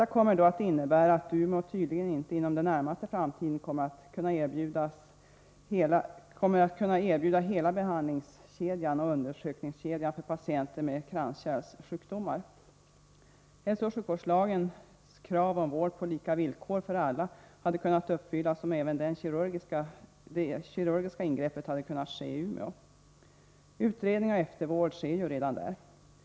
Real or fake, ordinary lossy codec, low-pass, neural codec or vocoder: real; none; none; none